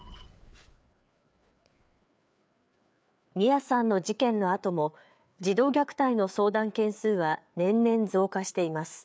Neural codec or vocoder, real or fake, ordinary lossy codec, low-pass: codec, 16 kHz, 4 kbps, FreqCodec, larger model; fake; none; none